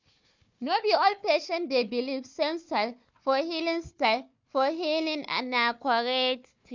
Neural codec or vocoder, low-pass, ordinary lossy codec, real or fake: codec, 16 kHz, 4 kbps, FunCodec, trained on Chinese and English, 50 frames a second; 7.2 kHz; MP3, 64 kbps; fake